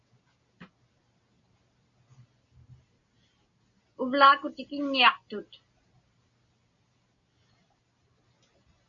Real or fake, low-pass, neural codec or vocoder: real; 7.2 kHz; none